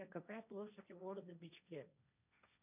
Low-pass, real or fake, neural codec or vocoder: 3.6 kHz; fake; codec, 16 kHz, 1.1 kbps, Voila-Tokenizer